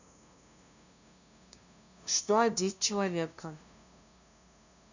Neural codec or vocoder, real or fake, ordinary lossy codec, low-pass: codec, 16 kHz, 0.5 kbps, FunCodec, trained on LibriTTS, 25 frames a second; fake; none; 7.2 kHz